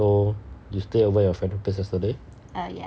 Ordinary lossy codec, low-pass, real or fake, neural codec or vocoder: none; none; real; none